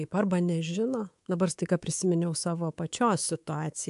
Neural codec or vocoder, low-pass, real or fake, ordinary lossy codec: codec, 24 kHz, 3.1 kbps, DualCodec; 10.8 kHz; fake; AAC, 64 kbps